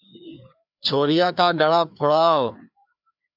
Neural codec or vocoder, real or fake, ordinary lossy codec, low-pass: codec, 16 kHz, 2 kbps, FreqCodec, larger model; fake; AAC, 48 kbps; 5.4 kHz